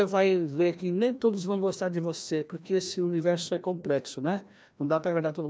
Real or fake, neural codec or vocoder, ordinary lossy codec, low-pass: fake; codec, 16 kHz, 1 kbps, FreqCodec, larger model; none; none